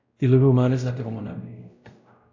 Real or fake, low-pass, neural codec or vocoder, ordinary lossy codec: fake; 7.2 kHz; codec, 16 kHz, 0.5 kbps, X-Codec, WavLM features, trained on Multilingual LibriSpeech; AAC, 48 kbps